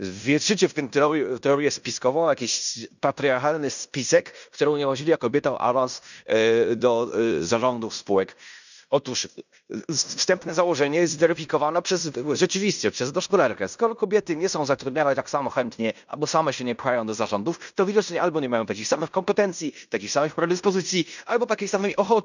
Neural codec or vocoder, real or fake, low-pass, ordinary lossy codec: codec, 16 kHz in and 24 kHz out, 0.9 kbps, LongCat-Audio-Codec, fine tuned four codebook decoder; fake; 7.2 kHz; none